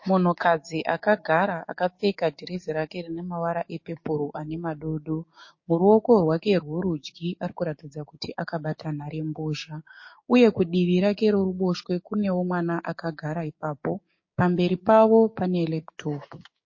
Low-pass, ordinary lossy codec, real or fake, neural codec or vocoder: 7.2 kHz; MP3, 32 kbps; fake; codec, 16 kHz, 6 kbps, DAC